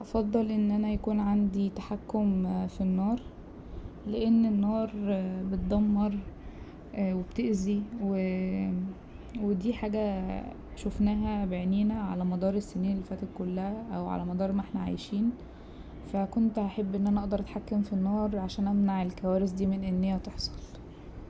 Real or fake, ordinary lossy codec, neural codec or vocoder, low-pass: real; none; none; none